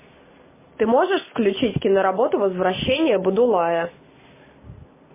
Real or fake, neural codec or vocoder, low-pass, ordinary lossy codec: real; none; 3.6 kHz; MP3, 16 kbps